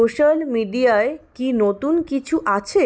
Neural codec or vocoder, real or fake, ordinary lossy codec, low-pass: none; real; none; none